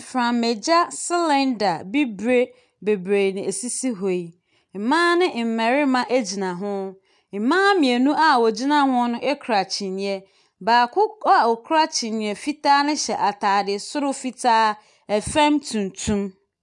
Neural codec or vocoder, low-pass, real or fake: none; 10.8 kHz; real